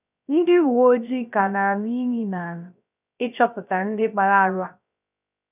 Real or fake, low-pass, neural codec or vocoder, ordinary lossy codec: fake; 3.6 kHz; codec, 16 kHz, 0.3 kbps, FocalCodec; none